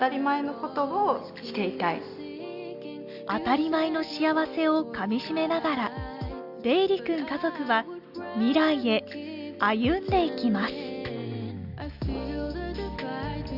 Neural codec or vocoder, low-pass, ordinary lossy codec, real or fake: none; 5.4 kHz; Opus, 64 kbps; real